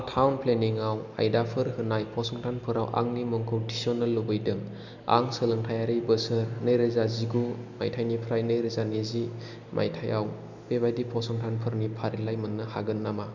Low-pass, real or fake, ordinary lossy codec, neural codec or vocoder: 7.2 kHz; real; none; none